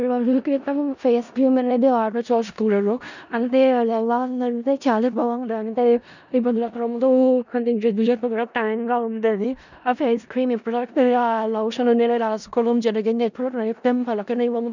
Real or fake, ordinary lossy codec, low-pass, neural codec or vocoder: fake; none; 7.2 kHz; codec, 16 kHz in and 24 kHz out, 0.4 kbps, LongCat-Audio-Codec, four codebook decoder